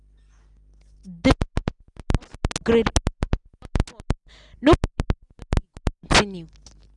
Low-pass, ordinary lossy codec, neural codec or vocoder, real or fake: 10.8 kHz; none; none; real